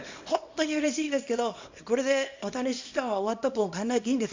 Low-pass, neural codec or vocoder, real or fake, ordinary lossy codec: 7.2 kHz; codec, 24 kHz, 0.9 kbps, WavTokenizer, small release; fake; MP3, 64 kbps